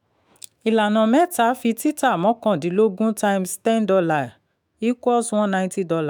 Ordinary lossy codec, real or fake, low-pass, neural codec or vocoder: none; fake; none; autoencoder, 48 kHz, 128 numbers a frame, DAC-VAE, trained on Japanese speech